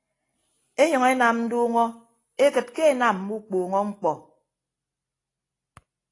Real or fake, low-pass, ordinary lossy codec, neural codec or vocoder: real; 10.8 kHz; AAC, 32 kbps; none